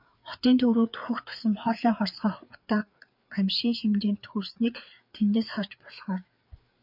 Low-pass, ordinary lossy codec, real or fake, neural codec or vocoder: 5.4 kHz; MP3, 48 kbps; fake; codec, 16 kHz, 4 kbps, FreqCodec, larger model